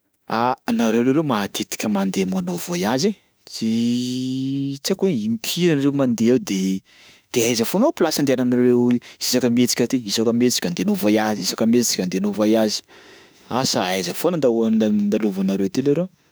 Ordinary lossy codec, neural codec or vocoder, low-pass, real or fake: none; autoencoder, 48 kHz, 32 numbers a frame, DAC-VAE, trained on Japanese speech; none; fake